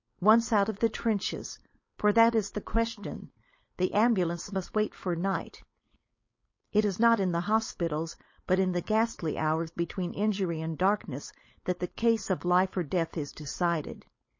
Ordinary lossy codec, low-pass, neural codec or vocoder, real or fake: MP3, 32 kbps; 7.2 kHz; codec, 16 kHz, 4.8 kbps, FACodec; fake